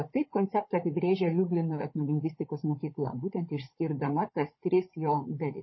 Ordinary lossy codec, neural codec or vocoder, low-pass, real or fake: MP3, 24 kbps; codec, 16 kHz, 16 kbps, FunCodec, trained on Chinese and English, 50 frames a second; 7.2 kHz; fake